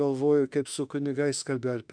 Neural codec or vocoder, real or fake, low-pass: autoencoder, 48 kHz, 32 numbers a frame, DAC-VAE, trained on Japanese speech; fake; 9.9 kHz